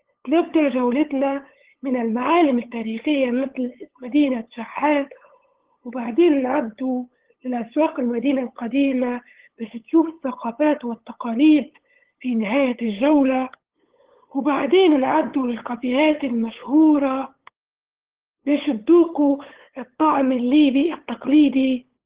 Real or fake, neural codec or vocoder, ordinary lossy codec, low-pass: fake; codec, 16 kHz, 8 kbps, FunCodec, trained on LibriTTS, 25 frames a second; Opus, 16 kbps; 3.6 kHz